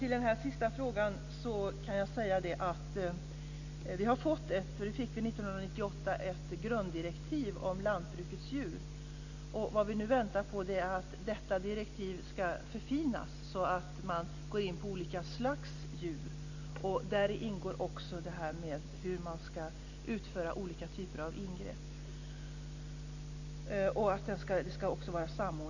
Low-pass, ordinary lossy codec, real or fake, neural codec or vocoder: 7.2 kHz; none; real; none